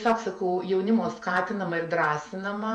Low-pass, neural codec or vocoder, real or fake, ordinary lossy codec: 10.8 kHz; vocoder, 48 kHz, 128 mel bands, Vocos; fake; MP3, 64 kbps